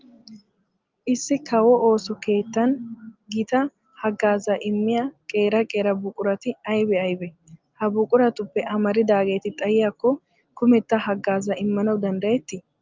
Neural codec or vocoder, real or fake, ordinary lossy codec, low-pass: none; real; Opus, 32 kbps; 7.2 kHz